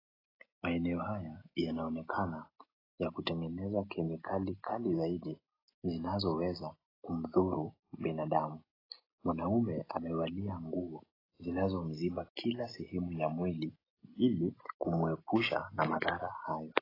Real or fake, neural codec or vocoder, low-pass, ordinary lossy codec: real; none; 5.4 kHz; AAC, 24 kbps